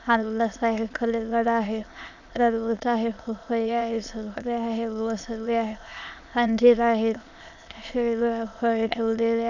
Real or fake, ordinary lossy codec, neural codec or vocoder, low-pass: fake; none; autoencoder, 22.05 kHz, a latent of 192 numbers a frame, VITS, trained on many speakers; 7.2 kHz